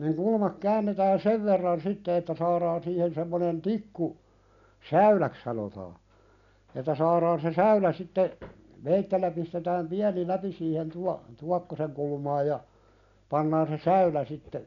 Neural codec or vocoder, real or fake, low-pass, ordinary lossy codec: none; real; 7.2 kHz; none